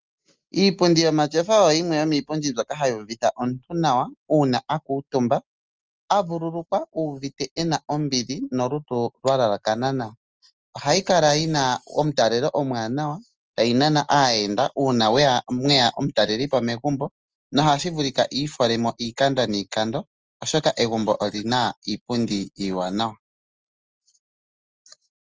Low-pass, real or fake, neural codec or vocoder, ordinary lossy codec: 7.2 kHz; real; none; Opus, 24 kbps